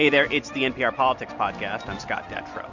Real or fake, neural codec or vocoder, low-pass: real; none; 7.2 kHz